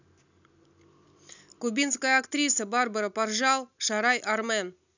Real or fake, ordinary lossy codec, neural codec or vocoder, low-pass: real; none; none; 7.2 kHz